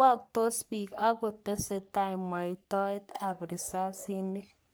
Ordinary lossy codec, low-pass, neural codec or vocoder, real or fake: none; none; codec, 44.1 kHz, 3.4 kbps, Pupu-Codec; fake